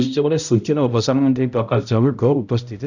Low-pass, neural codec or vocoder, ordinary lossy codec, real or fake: 7.2 kHz; codec, 16 kHz, 0.5 kbps, X-Codec, HuBERT features, trained on balanced general audio; none; fake